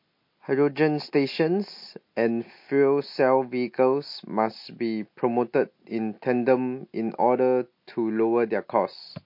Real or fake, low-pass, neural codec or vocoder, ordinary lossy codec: real; 5.4 kHz; none; MP3, 32 kbps